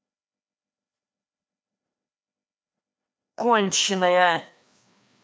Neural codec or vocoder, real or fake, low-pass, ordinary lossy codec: codec, 16 kHz, 2 kbps, FreqCodec, larger model; fake; none; none